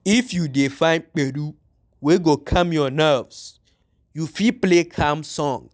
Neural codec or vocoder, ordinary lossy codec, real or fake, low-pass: none; none; real; none